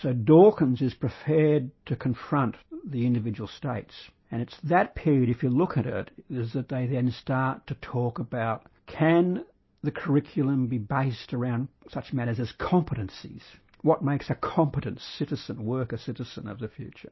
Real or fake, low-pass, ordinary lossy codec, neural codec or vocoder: real; 7.2 kHz; MP3, 24 kbps; none